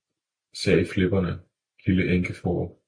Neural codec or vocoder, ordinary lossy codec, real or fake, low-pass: none; MP3, 48 kbps; real; 9.9 kHz